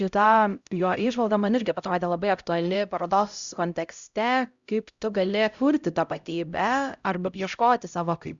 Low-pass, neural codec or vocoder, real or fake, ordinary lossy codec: 7.2 kHz; codec, 16 kHz, 0.5 kbps, X-Codec, HuBERT features, trained on LibriSpeech; fake; Opus, 64 kbps